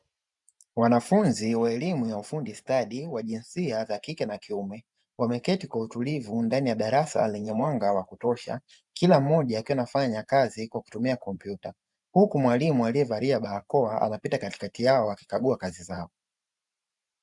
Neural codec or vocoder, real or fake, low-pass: vocoder, 44.1 kHz, 128 mel bands every 512 samples, BigVGAN v2; fake; 10.8 kHz